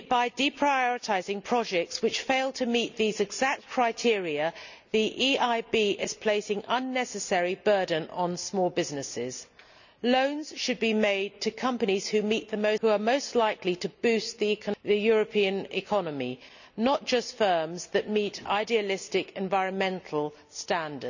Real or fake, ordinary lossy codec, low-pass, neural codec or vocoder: real; none; 7.2 kHz; none